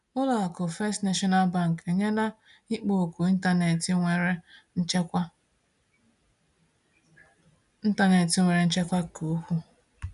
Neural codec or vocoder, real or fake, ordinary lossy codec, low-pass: none; real; none; 10.8 kHz